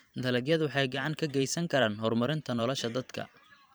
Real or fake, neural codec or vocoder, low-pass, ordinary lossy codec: fake; vocoder, 44.1 kHz, 128 mel bands every 512 samples, BigVGAN v2; none; none